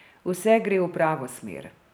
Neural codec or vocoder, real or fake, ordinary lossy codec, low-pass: vocoder, 44.1 kHz, 128 mel bands every 512 samples, BigVGAN v2; fake; none; none